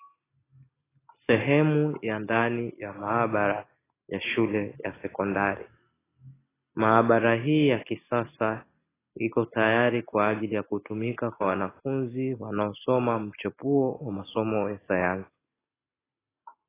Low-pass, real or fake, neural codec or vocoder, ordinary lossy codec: 3.6 kHz; real; none; AAC, 16 kbps